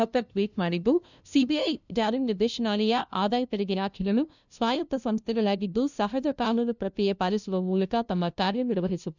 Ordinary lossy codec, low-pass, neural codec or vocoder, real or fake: none; 7.2 kHz; codec, 16 kHz, 0.5 kbps, FunCodec, trained on LibriTTS, 25 frames a second; fake